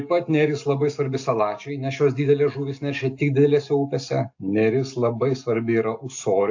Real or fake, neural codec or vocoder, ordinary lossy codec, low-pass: real; none; AAC, 48 kbps; 7.2 kHz